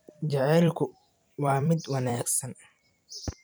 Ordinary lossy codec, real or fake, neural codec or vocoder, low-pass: none; fake; vocoder, 44.1 kHz, 128 mel bands every 256 samples, BigVGAN v2; none